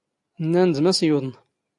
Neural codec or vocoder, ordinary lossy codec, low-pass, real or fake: none; AAC, 64 kbps; 10.8 kHz; real